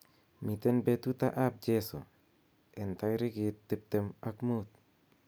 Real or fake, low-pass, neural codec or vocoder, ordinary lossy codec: real; none; none; none